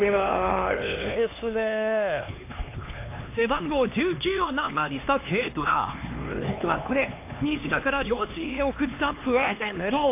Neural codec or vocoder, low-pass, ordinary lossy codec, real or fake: codec, 16 kHz, 2 kbps, X-Codec, HuBERT features, trained on LibriSpeech; 3.6 kHz; AAC, 24 kbps; fake